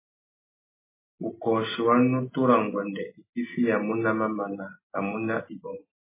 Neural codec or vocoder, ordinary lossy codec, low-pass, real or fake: none; MP3, 16 kbps; 3.6 kHz; real